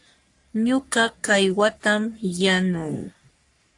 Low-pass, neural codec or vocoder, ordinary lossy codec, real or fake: 10.8 kHz; codec, 44.1 kHz, 3.4 kbps, Pupu-Codec; AAC, 64 kbps; fake